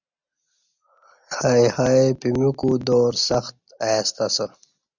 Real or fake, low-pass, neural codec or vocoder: real; 7.2 kHz; none